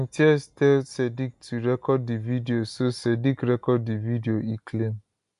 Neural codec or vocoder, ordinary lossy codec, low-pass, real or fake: none; none; 10.8 kHz; real